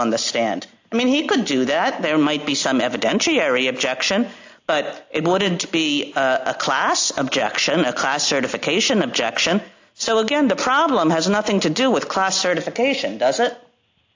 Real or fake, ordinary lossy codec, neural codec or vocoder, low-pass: real; AAC, 48 kbps; none; 7.2 kHz